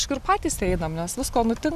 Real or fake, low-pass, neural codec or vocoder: real; 14.4 kHz; none